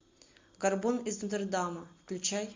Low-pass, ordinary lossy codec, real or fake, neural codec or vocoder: 7.2 kHz; MP3, 64 kbps; real; none